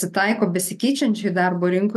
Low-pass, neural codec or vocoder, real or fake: 14.4 kHz; none; real